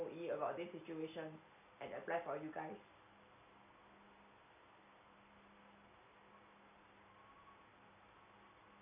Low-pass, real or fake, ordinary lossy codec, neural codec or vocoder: 3.6 kHz; real; none; none